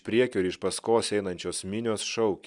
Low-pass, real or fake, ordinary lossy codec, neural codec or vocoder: 10.8 kHz; real; Opus, 64 kbps; none